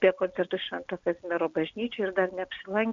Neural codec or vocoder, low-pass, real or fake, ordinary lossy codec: none; 7.2 kHz; real; Opus, 64 kbps